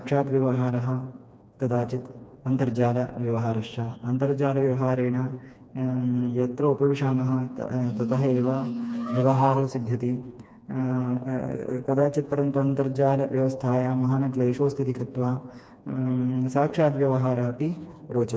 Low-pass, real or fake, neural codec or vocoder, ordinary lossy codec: none; fake; codec, 16 kHz, 2 kbps, FreqCodec, smaller model; none